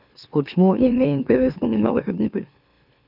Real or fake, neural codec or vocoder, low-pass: fake; autoencoder, 44.1 kHz, a latent of 192 numbers a frame, MeloTTS; 5.4 kHz